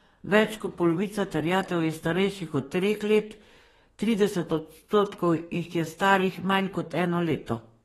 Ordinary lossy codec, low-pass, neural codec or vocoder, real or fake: AAC, 32 kbps; 14.4 kHz; codec, 32 kHz, 1.9 kbps, SNAC; fake